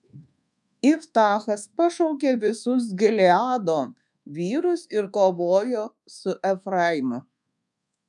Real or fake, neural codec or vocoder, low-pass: fake; codec, 24 kHz, 1.2 kbps, DualCodec; 10.8 kHz